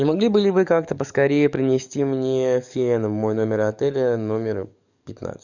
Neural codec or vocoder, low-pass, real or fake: codec, 44.1 kHz, 7.8 kbps, DAC; 7.2 kHz; fake